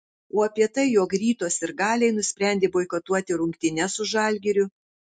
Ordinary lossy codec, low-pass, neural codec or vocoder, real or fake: MP3, 64 kbps; 9.9 kHz; none; real